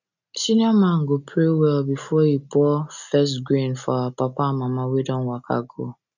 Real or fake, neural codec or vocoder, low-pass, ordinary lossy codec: real; none; 7.2 kHz; none